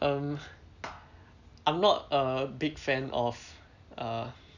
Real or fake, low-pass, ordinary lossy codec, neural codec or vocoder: real; 7.2 kHz; none; none